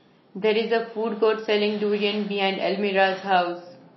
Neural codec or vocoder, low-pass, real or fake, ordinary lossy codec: none; 7.2 kHz; real; MP3, 24 kbps